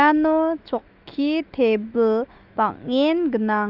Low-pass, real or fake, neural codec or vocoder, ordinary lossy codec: 5.4 kHz; fake; autoencoder, 48 kHz, 128 numbers a frame, DAC-VAE, trained on Japanese speech; Opus, 32 kbps